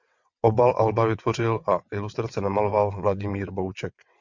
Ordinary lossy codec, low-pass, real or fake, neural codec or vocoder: Opus, 64 kbps; 7.2 kHz; fake; vocoder, 22.05 kHz, 80 mel bands, WaveNeXt